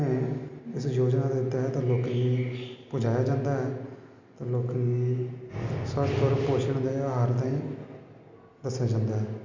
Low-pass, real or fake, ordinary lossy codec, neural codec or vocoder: 7.2 kHz; real; MP3, 48 kbps; none